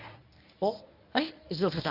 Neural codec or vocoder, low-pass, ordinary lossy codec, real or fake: codec, 24 kHz, 0.9 kbps, WavTokenizer, medium speech release version 1; 5.4 kHz; none; fake